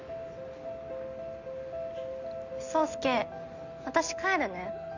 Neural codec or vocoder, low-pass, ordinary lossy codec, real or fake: none; 7.2 kHz; none; real